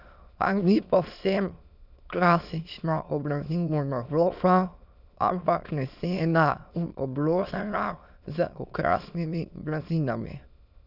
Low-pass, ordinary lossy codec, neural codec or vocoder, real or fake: 5.4 kHz; none; autoencoder, 22.05 kHz, a latent of 192 numbers a frame, VITS, trained on many speakers; fake